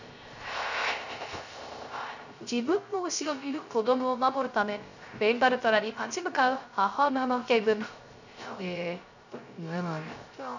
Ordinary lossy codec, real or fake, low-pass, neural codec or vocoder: none; fake; 7.2 kHz; codec, 16 kHz, 0.3 kbps, FocalCodec